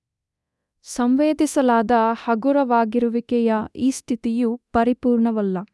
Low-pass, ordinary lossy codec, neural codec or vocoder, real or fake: none; none; codec, 24 kHz, 0.9 kbps, DualCodec; fake